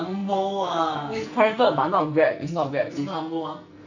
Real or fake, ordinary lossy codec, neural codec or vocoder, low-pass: fake; none; codec, 44.1 kHz, 2.6 kbps, SNAC; 7.2 kHz